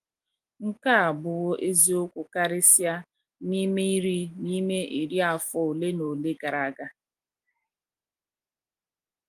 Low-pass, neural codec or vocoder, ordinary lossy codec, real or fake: 14.4 kHz; none; Opus, 32 kbps; real